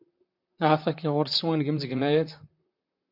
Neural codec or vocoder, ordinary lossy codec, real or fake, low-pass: vocoder, 44.1 kHz, 128 mel bands, Pupu-Vocoder; MP3, 48 kbps; fake; 5.4 kHz